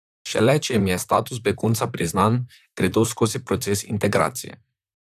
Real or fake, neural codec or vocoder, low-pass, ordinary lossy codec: fake; vocoder, 44.1 kHz, 128 mel bands, Pupu-Vocoder; 14.4 kHz; none